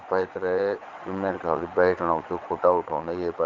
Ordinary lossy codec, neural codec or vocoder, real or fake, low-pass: Opus, 32 kbps; vocoder, 22.05 kHz, 80 mel bands, WaveNeXt; fake; 7.2 kHz